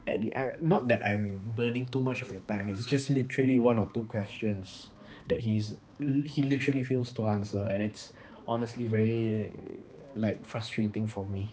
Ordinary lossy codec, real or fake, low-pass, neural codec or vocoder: none; fake; none; codec, 16 kHz, 2 kbps, X-Codec, HuBERT features, trained on balanced general audio